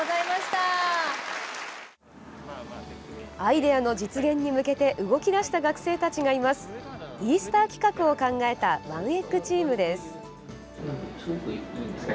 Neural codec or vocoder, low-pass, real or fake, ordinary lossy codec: none; none; real; none